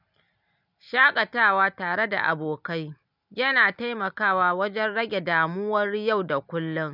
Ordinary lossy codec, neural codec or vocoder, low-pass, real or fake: none; none; 5.4 kHz; real